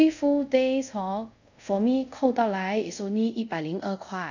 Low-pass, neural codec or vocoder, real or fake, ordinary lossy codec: 7.2 kHz; codec, 24 kHz, 0.5 kbps, DualCodec; fake; none